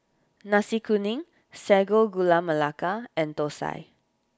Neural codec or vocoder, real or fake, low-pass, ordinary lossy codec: none; real; none; none